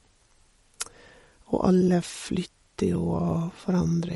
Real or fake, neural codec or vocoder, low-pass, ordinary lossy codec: real; none; 19.8 kHz; MP3, 48 kbps